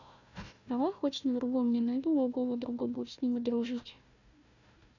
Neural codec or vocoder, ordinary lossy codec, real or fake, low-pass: codec, 16 kHz, 1 kbps, FunCodec, trained on Chinese and English, 50 frames a second; none; fake; 7.2 kHz